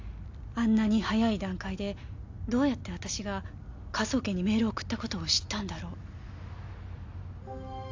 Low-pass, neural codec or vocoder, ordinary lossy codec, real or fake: 7.2 kHz; none; AAC, 48 kbps; real